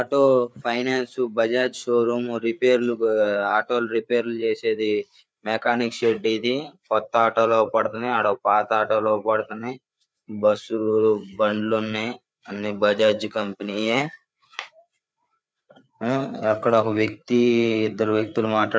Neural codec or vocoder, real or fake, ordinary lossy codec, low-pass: codec, 16 kHz, 4 kbps, FreqCodec, larger model; fake; none; none